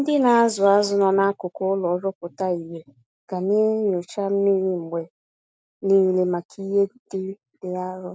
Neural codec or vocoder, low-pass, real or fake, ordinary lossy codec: none; none; real; none